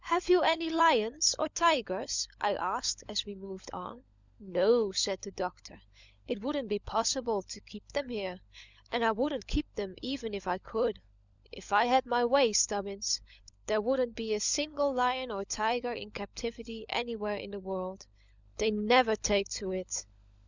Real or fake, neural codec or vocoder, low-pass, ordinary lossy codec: fake; codec, 16 kHz, 16 kbps, FunCodec, trained on LibriTTS, 50 frames a second; 7.2 kHz; Opus, 64 kbps